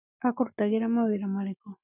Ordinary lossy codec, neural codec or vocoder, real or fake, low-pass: none; none; real; 3.6 kHz